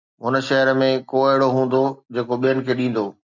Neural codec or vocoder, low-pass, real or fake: none; 7.2 kHz; real